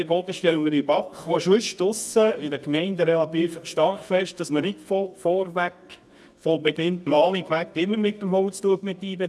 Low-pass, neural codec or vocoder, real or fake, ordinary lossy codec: none; codec, 24 kHz, 0.9 kbps, WavTokenizer, medium music audio release; fake; none